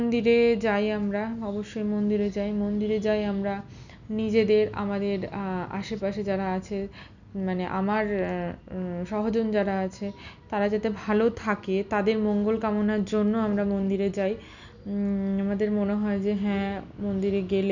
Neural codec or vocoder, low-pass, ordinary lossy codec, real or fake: none; 7.2 kHz; none; real